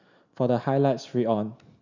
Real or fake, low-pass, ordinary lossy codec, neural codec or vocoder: real; 7.2 kHz; none; none